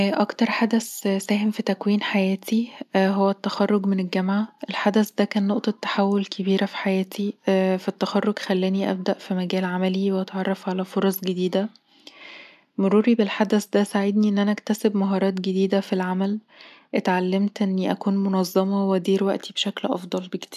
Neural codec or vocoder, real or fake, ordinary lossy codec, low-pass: none; real; none; 14.4 kHz